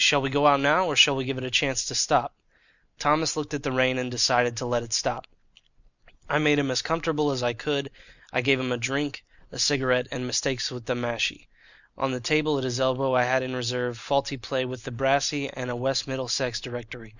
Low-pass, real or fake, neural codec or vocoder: 7.2 kHz; real; none